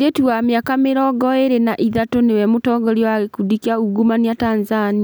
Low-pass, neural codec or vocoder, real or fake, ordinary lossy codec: none; none; real; none